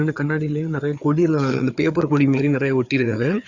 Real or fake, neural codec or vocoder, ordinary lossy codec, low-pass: fake; codec, 16 kHz, 4 kbps, FreqCodec, larger model; Opus, 64 kbps; 7.2 kHz